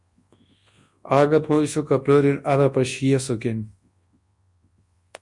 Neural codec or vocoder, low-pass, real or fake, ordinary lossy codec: codec, 24 kHz, 0.9 kbps, WavTokenizer, large speech release; 10.8 kHz; fake; MP3, 48 kbps